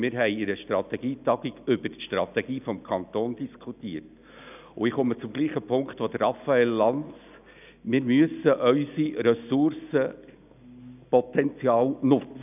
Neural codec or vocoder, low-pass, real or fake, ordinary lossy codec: none; 3.6 kHz; real; none